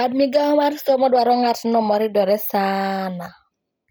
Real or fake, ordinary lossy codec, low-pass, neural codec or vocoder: real; none; none; none